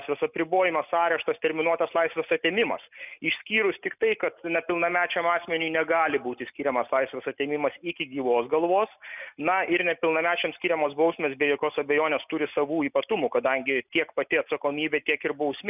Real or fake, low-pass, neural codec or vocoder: real; 3.6 kHz; none